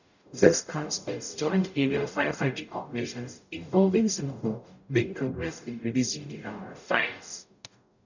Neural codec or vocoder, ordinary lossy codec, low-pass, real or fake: codec, 44.1 kHz, 0.9 kbps, DAC; none; 7.2 kHz; fake